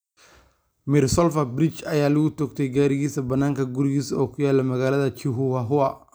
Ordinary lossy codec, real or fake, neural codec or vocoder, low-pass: none; real; none; none